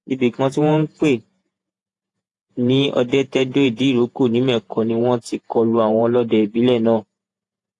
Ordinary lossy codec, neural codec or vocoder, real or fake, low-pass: AAC, 48 kbps; vocoder, 48 kHz, 128 mel bands, Vocos; fake; 10.8 kHz